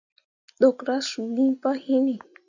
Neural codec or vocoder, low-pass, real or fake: none; 7.2 kHz; real